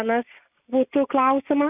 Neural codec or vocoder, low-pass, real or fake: none; 3.6 kHz; real